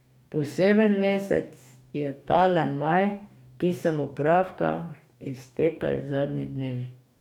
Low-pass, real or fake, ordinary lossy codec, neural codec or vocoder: 19.8 kHz; fake; none; codec, 44.1 kHz, 2.6 kbps, DAC